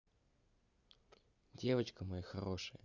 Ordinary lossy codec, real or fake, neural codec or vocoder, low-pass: none; real; none; 7.2 kHz